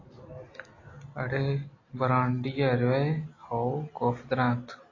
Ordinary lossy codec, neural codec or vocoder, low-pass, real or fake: AAC, 32 kbps; none; 7.2 kHz; real